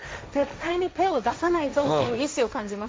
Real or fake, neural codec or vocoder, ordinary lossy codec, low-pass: fake; codec, 16 kHz, 1.1 kbps, Voila-Tokenizer; none; none